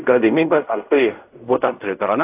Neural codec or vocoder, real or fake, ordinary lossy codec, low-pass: codec, 16 kHz in and 24 kHz out, 0.4 kbps, LongCat-Audio-Codec, fine tuned four codebook decoder; fake; AAC, 32 kbps; 3.6 kHz